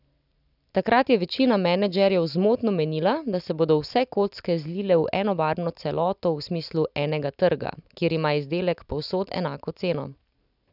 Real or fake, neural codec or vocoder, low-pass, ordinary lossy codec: real; none; 5.4 kHz; none